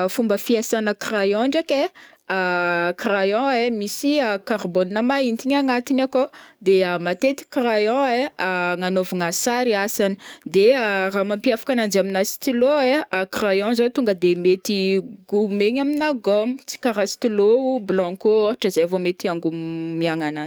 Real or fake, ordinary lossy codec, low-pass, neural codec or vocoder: fake; none; none; codec, 44.1 kHz, 7.8 kbps, DAC